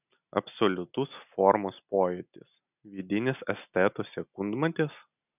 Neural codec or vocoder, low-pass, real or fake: none; 3.6 kHz; real